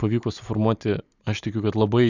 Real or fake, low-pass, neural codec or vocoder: real; 7.2 kHz; none